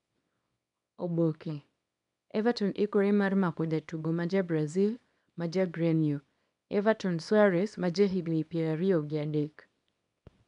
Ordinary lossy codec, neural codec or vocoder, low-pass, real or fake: none; codec, 24 kHz, 0.9 kbps, WavTokenizer, small release; 10.8 kHz; fake